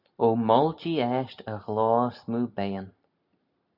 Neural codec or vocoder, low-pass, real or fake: none; 5.4 kHz; real